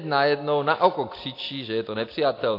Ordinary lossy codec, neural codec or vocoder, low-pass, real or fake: AAC, 32 kbps; none; 5.4 kHz; real